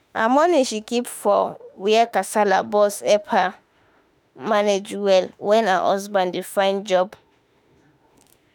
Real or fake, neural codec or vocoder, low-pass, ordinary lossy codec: fake; autoencoder, 48 kHz, 32 numbers a frame, DAC-VAE, trained on Japanese speech; none; none